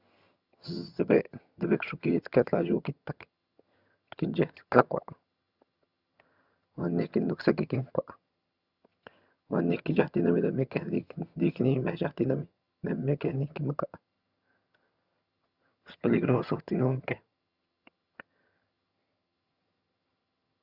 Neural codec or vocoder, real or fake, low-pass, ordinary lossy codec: vocoder, 22.05 kHz, 80 mel bands, HiFi-GAN; fake; 5.4 kHz; Opus, 64 kbps